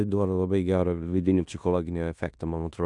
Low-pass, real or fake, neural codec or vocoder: 10.8 kHz; fake; codec, 16 kHz in and 24 kHz out, 0.9 kbps, LongCat-Audio-Codec, fine tuned four codebook decoder